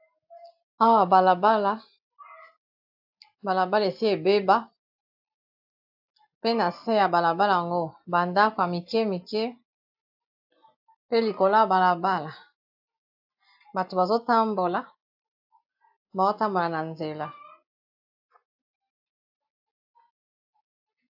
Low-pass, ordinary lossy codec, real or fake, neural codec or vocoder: 5.4 kHz; AAC, 48 kbps; real; none